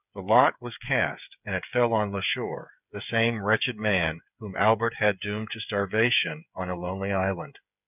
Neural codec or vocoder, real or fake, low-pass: codec, 16 kHz, 8 kbps, FreqCodec, smaller model; fake; 3.6 kHz